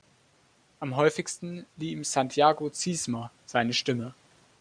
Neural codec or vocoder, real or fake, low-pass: none; real; 9.9 kHz